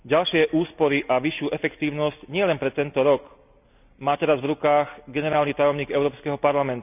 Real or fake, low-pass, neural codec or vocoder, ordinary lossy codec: real; 3.6 kHz; none; none